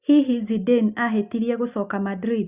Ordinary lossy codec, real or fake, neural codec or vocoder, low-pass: Opus, 64 kbps; real; none; 3.6 kHz